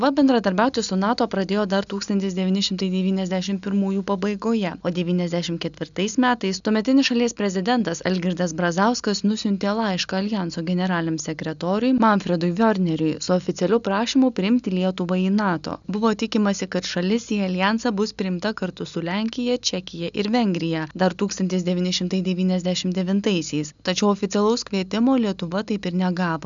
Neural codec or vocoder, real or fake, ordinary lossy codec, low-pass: none; real; MP3, 96 kbps; 7.2 kHz